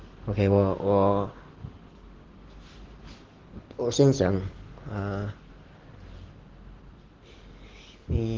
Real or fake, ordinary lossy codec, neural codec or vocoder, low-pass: real; Opus, 16 kbps; none; 7.2 kHz